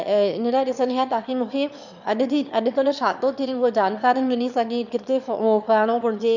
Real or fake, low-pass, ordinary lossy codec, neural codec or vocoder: fake; 7.2 kHz; none; autoencoder, 22.05 kHz, a latent of 192 numbers a frame, VITS, trained on one speaker